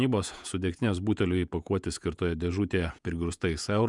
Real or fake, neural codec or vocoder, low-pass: fake; vocoder, 48 kHz, 128 mel bands, Vocos; 10.8 kHz